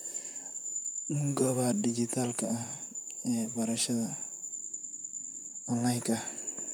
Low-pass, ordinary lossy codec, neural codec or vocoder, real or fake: none; none; vocoder, 44.1 kHz, 128 mel bands, Pupu-Vocoder; fake